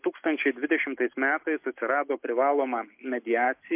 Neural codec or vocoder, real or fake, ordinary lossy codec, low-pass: none; real; MP3, 32 kbps; 3.6 kHz